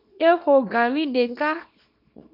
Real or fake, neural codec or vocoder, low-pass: fake; codec, 24 kHz, 0.9 kbps, WavTokenizer, small release; 5.4 kHz